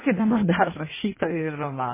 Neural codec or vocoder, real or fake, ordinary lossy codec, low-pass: codec, 16 kHz in and 24 kHz out, 1.1 kbps, FireRedTTS-2 codec; fake; MP3, 16 kbps; 3.6 kHz